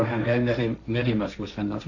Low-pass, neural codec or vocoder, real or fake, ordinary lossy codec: 7.2 kHz; codec, 16 kHz, 1.1 kbps, Voila-Tokenizer; fake; none